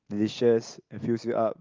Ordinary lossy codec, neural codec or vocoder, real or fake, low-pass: Opus, 32 kbps; none; real; 7.2 kHz